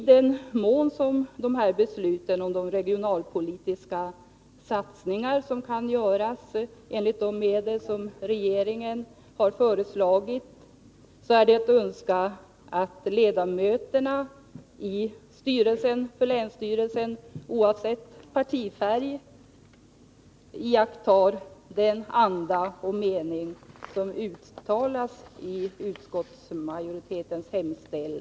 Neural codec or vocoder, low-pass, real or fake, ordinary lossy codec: none; none; real; none